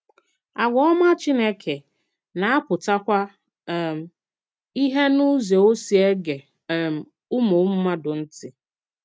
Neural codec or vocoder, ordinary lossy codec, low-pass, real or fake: none; none; none; real